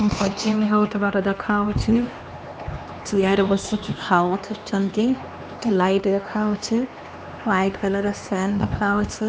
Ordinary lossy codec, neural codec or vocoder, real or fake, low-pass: none; codec, 16 kHz, 2 kbps, X-Codec, HuBERT features, trained on LibriSpeech; fake; none